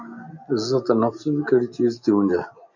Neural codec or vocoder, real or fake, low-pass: none; real; 7.2 kHz